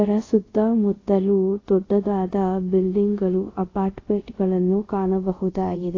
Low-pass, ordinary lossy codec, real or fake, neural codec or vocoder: 7.2 kHz; AAC, 32 kbps; fake; codec, 24 kHz, 0.5 kbps, DualCodec